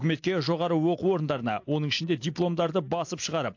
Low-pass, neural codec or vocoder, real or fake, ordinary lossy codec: 7.2 kHz; none; real; AAC, 48 kbps